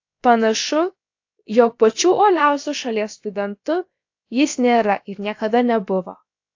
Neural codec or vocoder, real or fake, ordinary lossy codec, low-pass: codec, 16 kHz, about 1 kbps, DyCAST, with the encoder's durations; fake; AAC, 48 kbps; 7.2 kHz